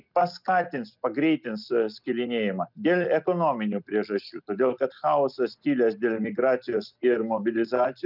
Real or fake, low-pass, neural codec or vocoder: real; 5.4 kHz; none